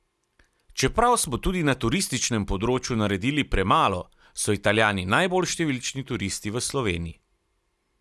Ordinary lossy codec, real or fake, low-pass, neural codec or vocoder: none; real; none; none